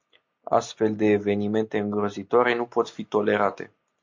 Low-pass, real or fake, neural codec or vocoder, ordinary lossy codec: 7.2 kHz; real; none; MP3, 48 kbps